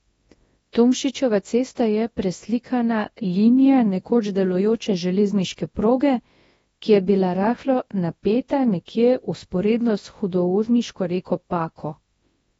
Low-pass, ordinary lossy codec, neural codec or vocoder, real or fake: 10.8 kHz; AAC, 24 kbps; codec, 24 kHz, 0.9 kbps, WavTokenizer, large speech release; fake